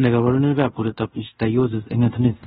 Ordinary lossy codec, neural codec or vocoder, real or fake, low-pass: AAC, 16 kbps; codec, 24 kHz, 0.5 kbps, DualCodec; fake; 10.8 kHz